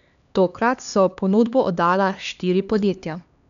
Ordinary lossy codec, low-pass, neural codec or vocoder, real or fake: none; 7.2 kHz; codec, 16 kHz, 2 kbps, X-Codec, HuBERT features, trained on LibriSpeech; fake